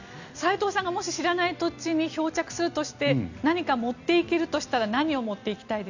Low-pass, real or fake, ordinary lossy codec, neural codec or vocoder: 7.2 kHz; real; none; none